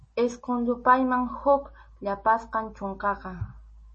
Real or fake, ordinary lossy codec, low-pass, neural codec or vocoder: real; MP3, 32 kbps; 10.8 kHz; none